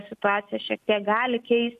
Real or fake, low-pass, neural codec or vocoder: real; 14.4 kHz; none